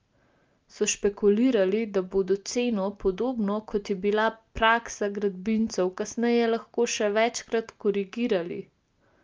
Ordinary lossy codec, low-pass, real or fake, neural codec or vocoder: Opus, 24 kbps; 7.2 kHz; real; none